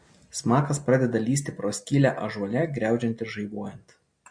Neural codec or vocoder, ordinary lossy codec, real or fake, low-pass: none; MP3, 48 kbps; real; 9.9 kHz